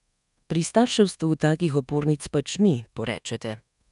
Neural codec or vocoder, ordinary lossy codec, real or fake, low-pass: codec, 24 kHz, 1.2 kbps, DualCodec; none; fake; 10.8 kHz